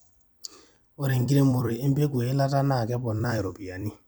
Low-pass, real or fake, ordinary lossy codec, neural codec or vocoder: none; fake; none; vocoder, 44.1 kHz, 128 mel bands every 256 samples, BigVGAN v2